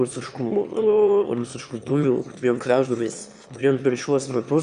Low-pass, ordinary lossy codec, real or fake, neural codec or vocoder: 9.9 kHz; Opus, 64 kbps; fake; autoencoder, 22.05 kHz, a latent of 192 numbers a frame, VITS, trained on one speaker